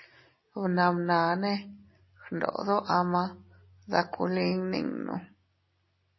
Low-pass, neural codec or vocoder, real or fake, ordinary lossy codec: 7.2 kHz; none; real; MP3, 24 kbps